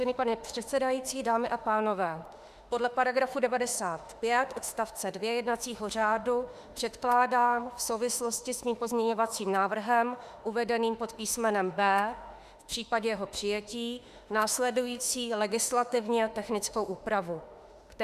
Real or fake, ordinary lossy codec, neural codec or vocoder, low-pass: fake; Opus, 64 kbps; autoencoder, 48 kHz, 32 numbers a frame, DAC-VAE, trained on Japanese speech; 14.4 kHz